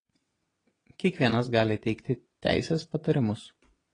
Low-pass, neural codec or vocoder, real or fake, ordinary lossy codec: 9.9 kHz; vocoder, 22.05 kHz, 80 mel bands, WaveNeXt; fake; AAC, 32 kbps